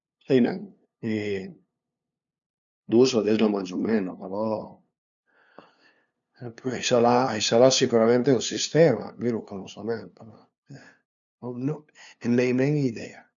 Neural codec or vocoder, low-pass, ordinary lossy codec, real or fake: codec, 16 kHz, 2 kbps, FunCodec, trained on LibriTTS, 25 frames a second; 7.2 kHz; MP3, 96 kbps; fake